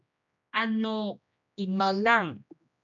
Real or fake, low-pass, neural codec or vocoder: fake; 7.2 kHz; codec, 16 kHz, 1 kbps, X-Codec, HuBERT features, trained on general audio